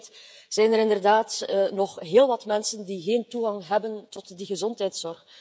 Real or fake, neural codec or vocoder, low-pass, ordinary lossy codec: fake; codec, 16 kHz, 16 kbps, FreqCodec, smaller model; none; none